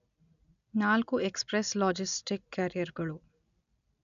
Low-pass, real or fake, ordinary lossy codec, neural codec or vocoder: 7.2 kHz; real; none; none